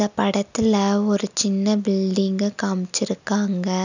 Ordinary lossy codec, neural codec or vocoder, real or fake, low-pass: none; none; real; 7.2 kHz